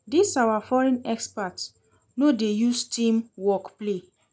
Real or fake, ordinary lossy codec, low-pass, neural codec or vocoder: real; none; none; none